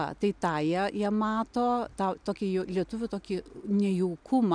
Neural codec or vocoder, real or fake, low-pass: none; real; 9.9 kHz